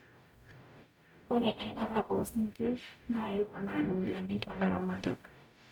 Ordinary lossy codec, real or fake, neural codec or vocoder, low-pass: none; fake; codec, 44.1 kHz, 0.9 kbps, DAC; 19.8 kHz